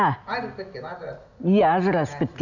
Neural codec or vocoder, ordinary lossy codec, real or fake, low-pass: none; none; real; 7.2 kHz